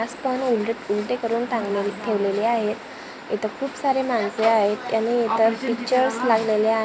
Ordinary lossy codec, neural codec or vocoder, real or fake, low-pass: none; none; real; none